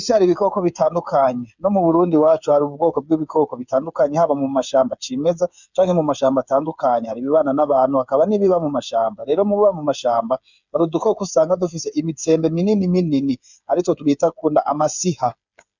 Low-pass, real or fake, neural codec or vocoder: 7.2 kHz; fake; codec, 16 kHz, 8 kbps, FreqCodec, smaller model